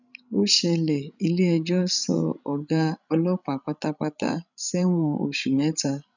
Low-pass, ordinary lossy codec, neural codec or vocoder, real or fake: 7.2 kHz; none; codec, 16 kHz, 16 kbps, FreqCodec, larger model; fake